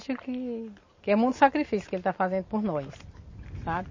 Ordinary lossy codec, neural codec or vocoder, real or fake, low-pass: MP3, 32 kbps; none; real; 7.2 kHz